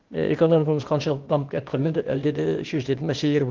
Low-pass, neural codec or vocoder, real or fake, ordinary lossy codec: 7.2 kHz; codec, 16 kHz, 0.8 kbps, ZipCodec; fake; Opus, 32 kbps